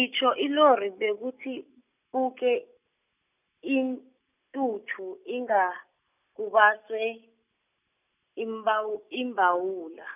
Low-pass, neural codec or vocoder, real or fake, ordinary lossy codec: 3.6 kHz; none; real; none